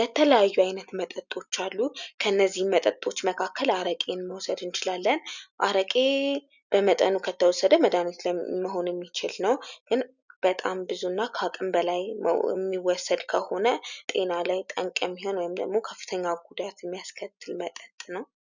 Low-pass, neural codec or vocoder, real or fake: 7.2 kHz; none; real